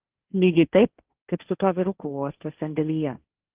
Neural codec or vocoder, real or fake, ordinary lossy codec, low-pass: codec, 16 kHz, 1.1 kbps, Voila-Tokenizer; fake; Opus, 32 kbps; 3.6 kHz